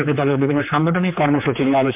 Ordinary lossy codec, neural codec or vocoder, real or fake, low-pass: MP3, 32 kbps; codec, 16 kHz, 4 kbps, X-Codec, HuBERT features, trained on general audio; fake; 3.6 kHz